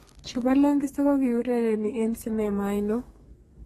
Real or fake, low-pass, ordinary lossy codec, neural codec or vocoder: fake; 14.4 kHz; AAC, 32 kbps; codec, 32 kHz, 1.9 kbps, SNAC